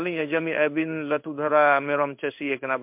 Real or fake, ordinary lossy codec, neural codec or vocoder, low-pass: fake; MP3, 32 kbps; codec, 16 kHz in and 24 kHz out, 1 kbps, XY-Tokenizer; 3.6 kHz